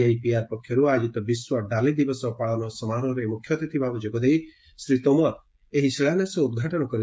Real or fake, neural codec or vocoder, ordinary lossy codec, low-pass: fake; codec, 16 kHz, 8 kbps, FreqCodec, smaller model; none; none